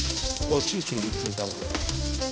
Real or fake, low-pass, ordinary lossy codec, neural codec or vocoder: fake; none; none; codec, 16 kHz, 1 kbps, X-Codec, HuBERT features, trained on balanced general audio